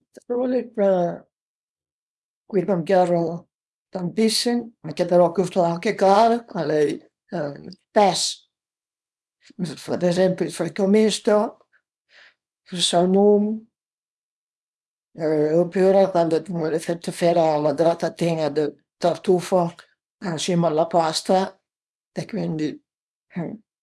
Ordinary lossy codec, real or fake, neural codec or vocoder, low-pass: none; fake; codec, 24 kHz, 0.9 kbps, WavTokenizer, small release; none